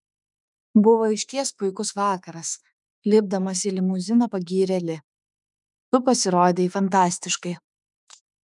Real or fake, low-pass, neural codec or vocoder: fake; 10.8 kHz; autoencoder, 48 kHz, 32 numbers a frame, DAC-VAE, trained on Japanese speech